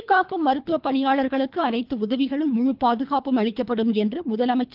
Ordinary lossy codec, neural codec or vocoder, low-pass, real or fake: Opus, 24 kbps; codec, 24 kHz, 3 kbps, HILCodec; 5.4 kHz; fake